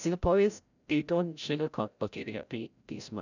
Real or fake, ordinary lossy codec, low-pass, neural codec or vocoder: fake; none; 7.2 kHz; codec, 16 kHz, 0.5 kbps, FreqCodec, larger model